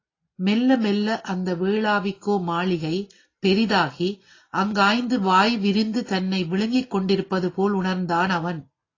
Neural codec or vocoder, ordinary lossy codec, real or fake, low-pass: none; AAC, 32 kbps; real; 7.2 kHz